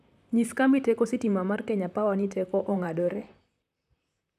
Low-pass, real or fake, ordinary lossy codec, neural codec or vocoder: 14.4 kHz; fake; none; vocoder, 44.1 kHz, 128 mel bands, Pupu-Vocoder